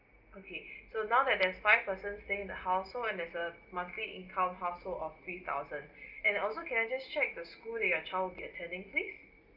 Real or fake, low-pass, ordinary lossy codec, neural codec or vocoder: real; 5.4 kHz; Opus, 24 kbps; none